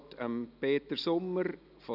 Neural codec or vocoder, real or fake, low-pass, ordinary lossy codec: none; real; 5.4 kHz; none